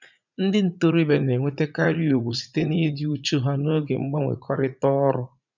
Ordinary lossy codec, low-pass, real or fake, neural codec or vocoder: none; 7.2 kHz; fake; vocoder, 44.1 kHz, 80 mel bands, Vocos